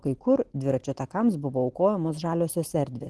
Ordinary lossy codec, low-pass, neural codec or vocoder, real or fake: Opus, 16 kbps; 10.8 kHz; none; real